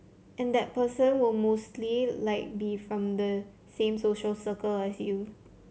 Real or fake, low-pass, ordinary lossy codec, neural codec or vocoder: real; none; none; none